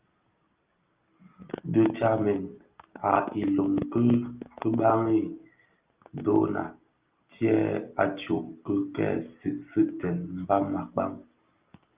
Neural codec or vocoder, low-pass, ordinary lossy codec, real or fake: none; 3.6 kHz; Opus, 32 kbps; real